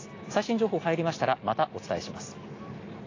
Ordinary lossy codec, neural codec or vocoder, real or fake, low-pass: AAC, 32 kbps; none; real; 7.2 kHz